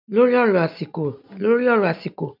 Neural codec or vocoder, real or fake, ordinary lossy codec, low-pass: none; real; MP3, 48 kbps; 5.4 kHz